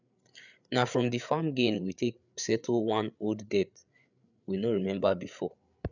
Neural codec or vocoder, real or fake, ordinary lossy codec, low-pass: codec, 16 kHz, 8 kbps, FreqCodec, larger model; fake; none; 7.2 kHz